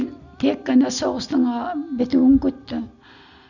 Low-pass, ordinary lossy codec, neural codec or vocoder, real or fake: 7.2 kHz; none; none; real